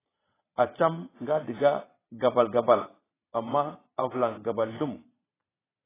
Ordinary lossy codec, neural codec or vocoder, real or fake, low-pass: AAC, 16 kbps; vocoder, 22.05 kHz, 80 mel bands, Vocos; fake; 3.6 kHz